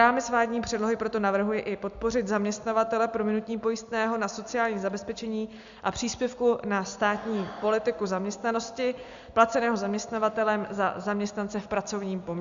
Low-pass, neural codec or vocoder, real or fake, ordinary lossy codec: 7.2 kHz; none; real; Opus, 64 kbps